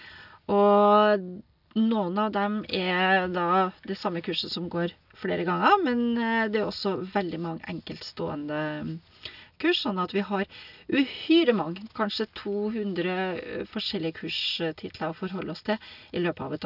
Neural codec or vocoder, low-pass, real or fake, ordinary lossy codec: none; 5.4 kHz; real; none